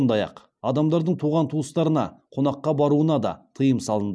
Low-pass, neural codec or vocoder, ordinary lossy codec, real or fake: none; none; none; real